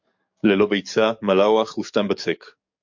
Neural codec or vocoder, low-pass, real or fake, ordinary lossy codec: codec, 44.1 kHz, 7.8 kbps, DAC; 7.2 kHz; fake; MP3, 48 kbps